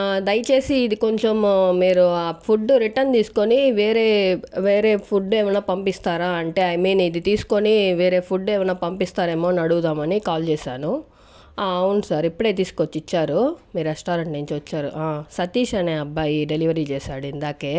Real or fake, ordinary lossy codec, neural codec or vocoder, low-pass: real; none; none; none